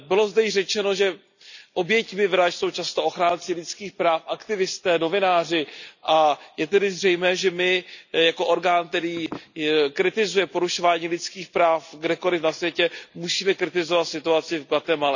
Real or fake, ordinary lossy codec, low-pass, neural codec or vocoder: real; none; 7.2 kHz; none